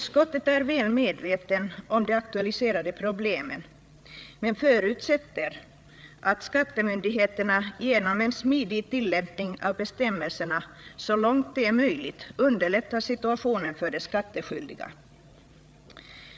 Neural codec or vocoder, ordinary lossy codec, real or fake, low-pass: codec, 16 kHz, 8 kbps, FreqCodec, larger model; none; fake; none